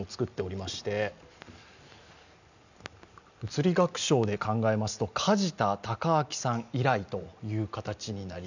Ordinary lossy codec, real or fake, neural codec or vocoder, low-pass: none; real; none; 7.2 kHz